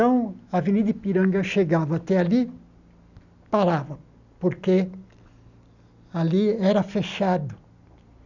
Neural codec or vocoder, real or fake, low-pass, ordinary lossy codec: none; real; 7.2 kHz; none